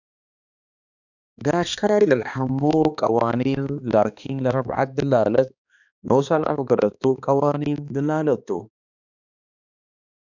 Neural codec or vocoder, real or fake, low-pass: codec, 16 kHz, 2 kbps, X-Codec, HuBERT features, trained on balanced general audio; fake; 7.2 kHz